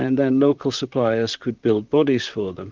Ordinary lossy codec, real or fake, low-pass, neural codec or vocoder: Opus, 24 kbps; fake; 7.2 kHz; vocoder, 44.1 kHz, 128 mel bands, Pupu-Vocoder